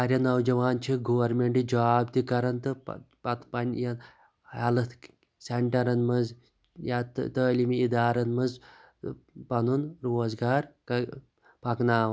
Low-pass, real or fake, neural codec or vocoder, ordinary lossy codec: none; real; none; none